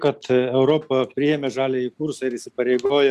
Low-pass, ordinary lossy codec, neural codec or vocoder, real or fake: 14.4 kHz; Opus, 64 kbps; none; real